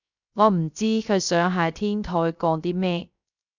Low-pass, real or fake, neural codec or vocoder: 7.2 kHz; fake; codec, 16 kHz, 0.3 kbps, FocalCodec